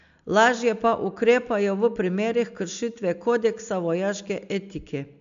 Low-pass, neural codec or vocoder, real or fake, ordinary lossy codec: 7.2 kHz; none; real; MP3, 64 kbps